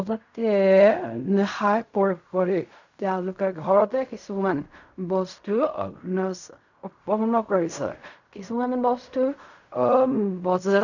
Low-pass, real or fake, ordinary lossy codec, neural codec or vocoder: 7.2 kHz; fake; none; codec, 16 kHz in and 24 kHz out, 0.4 kbps, LongCat-Audio-Codec, fine tuned four codebook decoder